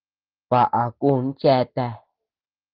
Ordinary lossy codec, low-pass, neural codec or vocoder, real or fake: Opus, 16 kbps; 5.4 kHz; none; real